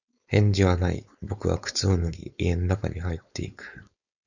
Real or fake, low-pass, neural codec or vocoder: fake; 7.2 kHz; codec, 16 kHz, 4.8 kbps, FACodec